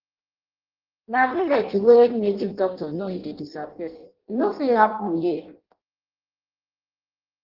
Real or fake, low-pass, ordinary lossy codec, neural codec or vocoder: fake; 5.4 kHz; Opus, 16 kbps; codec, 16 kHz in and 24 kHz out, 0.6 kbps, FireRedTTS-2 codec